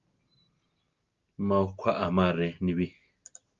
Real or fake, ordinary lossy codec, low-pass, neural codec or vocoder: real; Opus, 32 kbps; 7.2 kHz; none